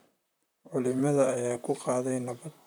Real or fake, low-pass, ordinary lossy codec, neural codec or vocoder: fake; none; none; vocoder, 44.1 kHz, 128 mel bands, Pupu-Vocoder